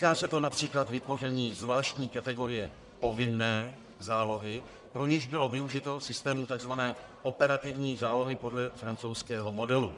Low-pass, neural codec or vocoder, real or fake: 10.8 kHz; codec, 44.1 kHz, 1.7 kbps, Pupu-Codec; fake